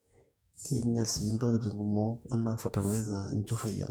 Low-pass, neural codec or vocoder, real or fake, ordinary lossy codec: none; codec, 44.1 kHz, 2.6 kbps, DAC; fake; none